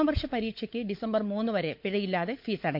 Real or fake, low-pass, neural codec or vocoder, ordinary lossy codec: fake; 5.4 kHz; codec, 16 kHz, 16 kbps, FunCodec, trained on LibriTTS, 50 frames a second; MP3, 48 kbps